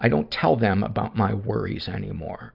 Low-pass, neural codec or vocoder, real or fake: 5.4 kHz; none; real